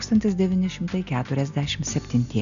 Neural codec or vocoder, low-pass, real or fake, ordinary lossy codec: none; 7.2 kHz; real; MP3, 96 kbps